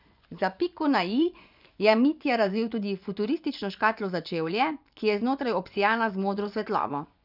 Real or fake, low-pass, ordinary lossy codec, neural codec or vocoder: fake; 5.4 kHz; none; vocoder, 24 kHz, 100 mel bands, Vocos